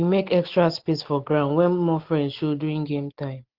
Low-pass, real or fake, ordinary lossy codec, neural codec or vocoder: 5.4 kHz; real; Opus, 16 kbps; none